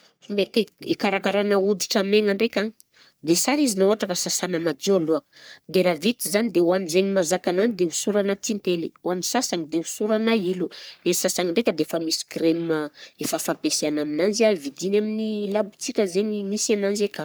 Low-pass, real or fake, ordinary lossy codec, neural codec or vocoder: none; fake; none; codec, 44.1 kHz, 3.4 kbps, Pupu-Codec